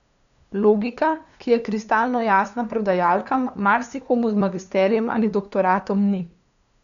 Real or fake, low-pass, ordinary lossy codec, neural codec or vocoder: fake; 7.2 kHz; none; codec, 16 kHz, 2 kbps, FunCodec, trained on LibriTTS, 25 frames a second